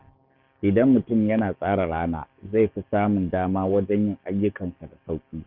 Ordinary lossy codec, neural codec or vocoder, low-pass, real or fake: Opus, 64 kbps; codec, 44.1 kHz, 7.8 kbps, DAC; 5.4 kHz; fake